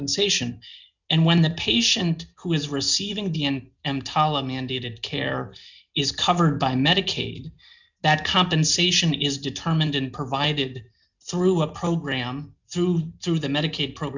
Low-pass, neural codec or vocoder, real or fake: 7.2 kHz; none; real